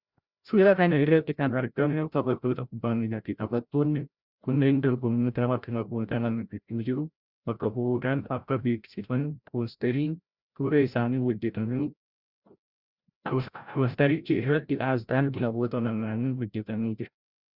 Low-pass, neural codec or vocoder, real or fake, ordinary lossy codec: 5.4 kHz; codec, 16 kHz, 0.5 kbps, FreqCodec, larger model; fake; Opus, 64 kbps